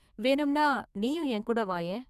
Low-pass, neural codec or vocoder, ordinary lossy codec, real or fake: 14.4 kHz; codec, 32 kHz, 1.9 kbps, SNAC; none; fake